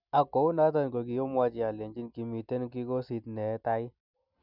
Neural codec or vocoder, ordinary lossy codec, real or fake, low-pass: none; none; real; 5.4 kHz